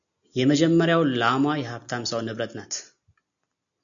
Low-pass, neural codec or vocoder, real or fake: 7.2 kHz; none; real